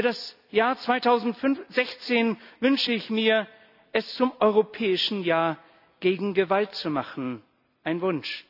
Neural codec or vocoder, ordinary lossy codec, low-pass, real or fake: none; AAC, 48 kbps; 5.4 kHz; real